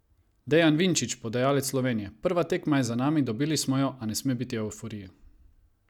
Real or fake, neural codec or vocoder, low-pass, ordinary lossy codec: real; none; 19.8 kHz; none